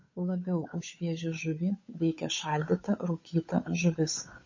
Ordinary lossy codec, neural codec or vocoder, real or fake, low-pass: MP3, 32 kbps; codec, 16 kHz, 8 kbps, FunCodec, trained on Chinese and English, 25 frames a second; fake; 7.2 kHz